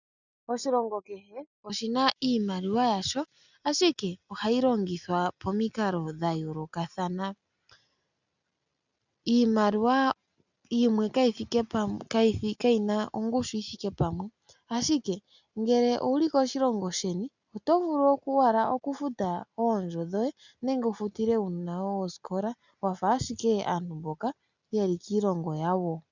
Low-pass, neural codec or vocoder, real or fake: 7.2 kHz; none; real